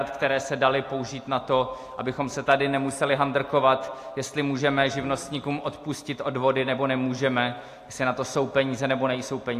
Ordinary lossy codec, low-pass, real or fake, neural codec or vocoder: AAC, 64 kbps; 14.4 kHz; real; none